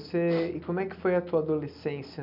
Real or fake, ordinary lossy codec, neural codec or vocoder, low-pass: real; none; none; 5.4 kHz